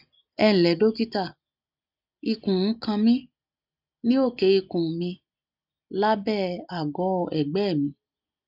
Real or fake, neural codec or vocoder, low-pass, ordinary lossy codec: real; none; 5.4 kHz; none